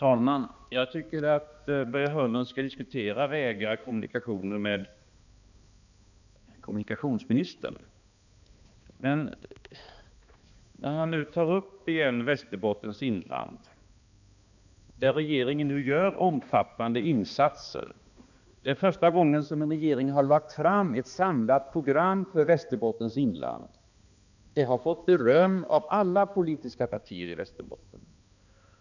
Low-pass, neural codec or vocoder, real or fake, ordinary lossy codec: 7.2 kHz; codec, 16 kHz, 2 kbps, X-Codec, HuBERT features, trained on balanced general audio; fake; none